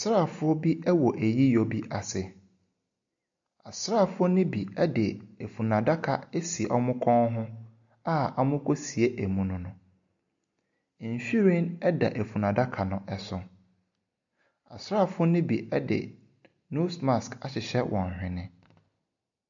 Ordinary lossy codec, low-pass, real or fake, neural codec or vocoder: AAC, 64 kbps; 7.2 kHz; real; none